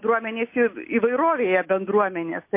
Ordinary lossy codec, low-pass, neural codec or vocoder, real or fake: MP3, 24 kbps; 3.6 kHz; none; real